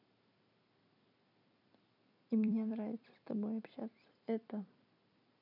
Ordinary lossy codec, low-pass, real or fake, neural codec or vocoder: none; 5.4 kHz; fake; vocoder, 44.1 kHz, 128 mel bands every 256 samples, BigVGAN v2